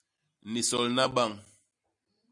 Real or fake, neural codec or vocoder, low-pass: real; none; 10.8 kHz